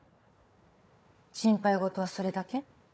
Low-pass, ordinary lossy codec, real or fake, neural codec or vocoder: none; none; fake; codec, 16 kHz, 4 kbps, FunCodec, trained on Chinese and English, 50 frames a second